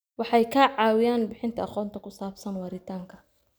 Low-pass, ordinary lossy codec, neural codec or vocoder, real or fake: none; none; none; real